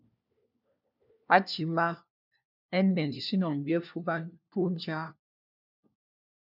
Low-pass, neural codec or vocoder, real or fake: 5.4 kHz; codec, 16 kHz, 1 kbps, FunCodec, trained on LibriTTS, 50 frames a second; fake